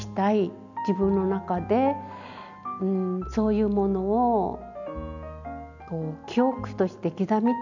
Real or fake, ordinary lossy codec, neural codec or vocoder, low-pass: real; none; none; 7.2 kHz